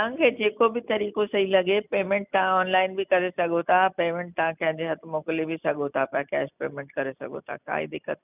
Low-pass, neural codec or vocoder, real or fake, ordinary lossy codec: 3.6 kHz; none; real; none